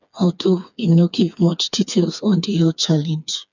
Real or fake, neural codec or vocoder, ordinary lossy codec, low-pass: fake; codec, 16 kHz, 4 kbps, FreqCodec, smaller model; none; 7.2 kHz